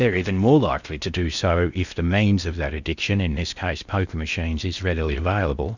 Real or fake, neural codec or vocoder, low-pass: fake; codec, 16 kHz in and 24 kHz out, 0.6 kbps, FocalCodec, streaming, 4096 codes; 7.2 kHz